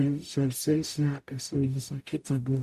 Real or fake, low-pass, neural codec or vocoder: fake; 14.4 kHz; codec, 44.1 kHz, 0.9 kbps, DAC